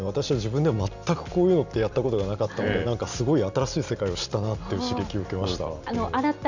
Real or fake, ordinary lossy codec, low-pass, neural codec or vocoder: real; none; 7.2 kHz; none